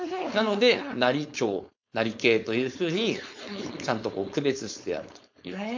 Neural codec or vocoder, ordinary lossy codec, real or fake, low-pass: codec, 16 kHz, 4.8 kbps, FACodec; MP3, 48 kbps; fake; 7.2 kHz